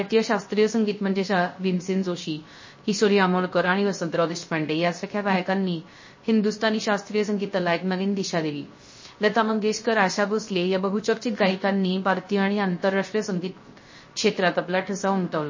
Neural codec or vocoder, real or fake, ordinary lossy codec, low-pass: codec, 16 kHz, 0.3 kbps, FocalCodec; fake; MP3, 32 kbps; 7.2 kHz